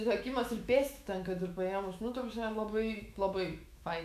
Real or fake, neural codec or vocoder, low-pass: fake; autoencoder, 48 kHz, 128 numbers a frame, DAC-VAE, trained on Japanese speech; 14.4 kHz